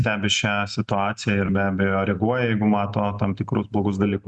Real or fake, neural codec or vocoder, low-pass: real; none; 10.8 kHz